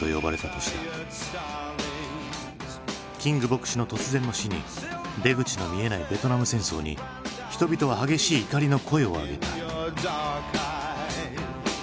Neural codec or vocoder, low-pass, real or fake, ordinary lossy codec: none; none; real; none